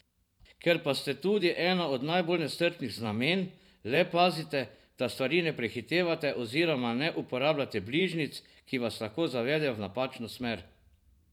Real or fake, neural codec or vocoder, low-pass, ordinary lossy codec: fake; vocoder, 44.1 kHz, 128 mel bands every 512 samples, BigVGAN v2; 19.8 kHz; none